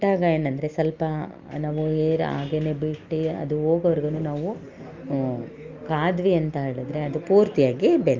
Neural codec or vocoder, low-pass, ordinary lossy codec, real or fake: none; 7.2 kHz; Opus, 24 kbps; real